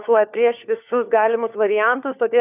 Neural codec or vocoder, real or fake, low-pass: codec, 16 kHz, 4 kbps, FunCodec, trained on LibriTTS, 50 frames a second; fake; 3.6 kHz